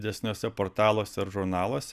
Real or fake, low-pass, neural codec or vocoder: real; 14.4 kHz; none